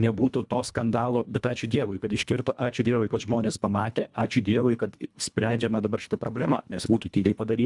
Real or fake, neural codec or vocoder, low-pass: fake; codec, 24 kHz, 1.5 kbps, HILCodec; 10.8 kHz